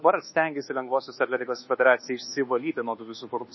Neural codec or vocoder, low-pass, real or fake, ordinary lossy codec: codec, 24 kHz, 1.2 kbps, DualCodec; 7.2 kHz; fake; MP3, 24 kbps